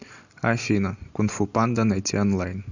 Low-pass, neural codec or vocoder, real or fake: 7.2 kHz; none; real